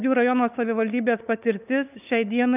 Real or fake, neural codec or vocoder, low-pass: fake; codec, 16 kHz, 8 kbps, FunCodec, trained on LibriTTS, 25 frames a second; 3.6 kHz